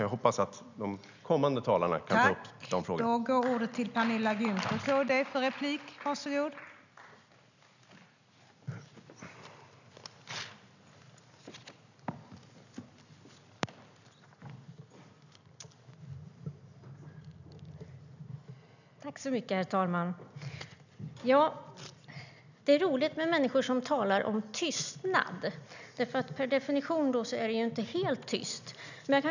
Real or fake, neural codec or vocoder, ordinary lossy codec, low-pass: real; none; none; 7.2 kHz